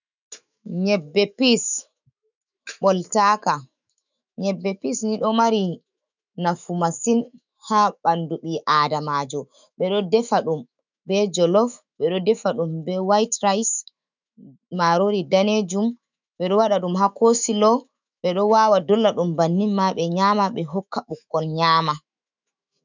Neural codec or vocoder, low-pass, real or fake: autoencoder, 48 kHz, 128 numbers a frame, DAC-VAE, trained on Japanese speech; 7.2 kHz; fake